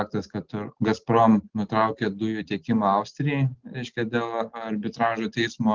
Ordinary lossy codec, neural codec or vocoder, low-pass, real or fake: Opus, 16 kbps; none; 7.2 kHz; real